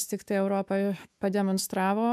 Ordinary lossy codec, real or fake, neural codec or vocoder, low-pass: AAC, 96 kbps; fake; autoencoder, 48 kHz, 128 numbers a frame, DAC-VAE, trained on Japanese speech; 14.4 kHz